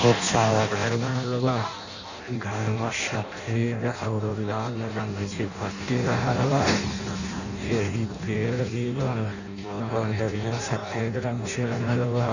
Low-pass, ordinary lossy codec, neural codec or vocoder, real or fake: 7.2 kHz; none; codec, 16 kHz in and 24 kHz out, 0.6 kbps, FireRedTTS-2 codec; fake